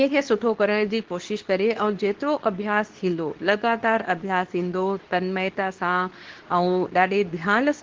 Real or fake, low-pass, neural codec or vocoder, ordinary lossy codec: fake; 7.2 kHz; codec, 24 kHz, 0.9 kbps, WavTokenizer, medium speech release version 2; Opus, 16 kbps